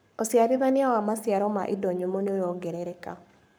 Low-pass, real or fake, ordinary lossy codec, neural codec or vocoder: none; fake; none; codec, 44.1 kHz, 7.8 kbps, Pupu-Codec